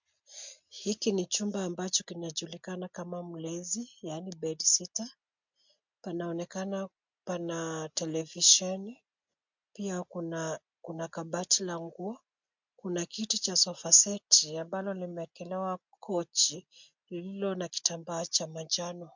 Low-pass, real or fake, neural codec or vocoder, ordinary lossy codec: 7.2 kHz; real; none; MP3, 64 kbps